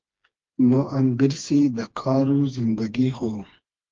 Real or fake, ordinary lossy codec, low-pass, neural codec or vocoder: fake; Opus, 32 kbps; 7.2 kHz; codec, 16 kHz, 2 kbps, FreqCodec, smaller model